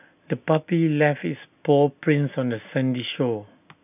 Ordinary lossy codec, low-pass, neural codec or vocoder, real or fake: none; 3.6 kHz; none; real